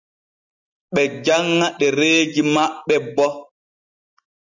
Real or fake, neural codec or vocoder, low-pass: real; none; 7.2 kHz